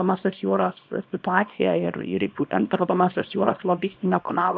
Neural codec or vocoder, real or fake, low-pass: codec, 24 kHz, 0.9 kbps, WavTokenizer, small release; fake; 7.2 kHz